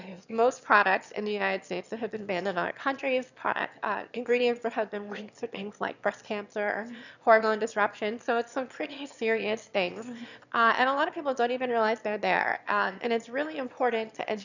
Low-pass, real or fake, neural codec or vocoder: 7.2 kHz; fake; autoencoder, 22.05 kHz, a latent of 192 numbers a frame, VITS, trained on one speaker